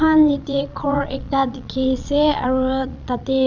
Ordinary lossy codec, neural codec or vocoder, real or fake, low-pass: none; vocoder, 22.05 kHz, 80 mel bands, Vocos; fake; 7.2 kHz